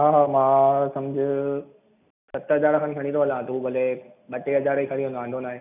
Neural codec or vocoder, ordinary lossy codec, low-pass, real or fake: none; none; 3.6 kHz; real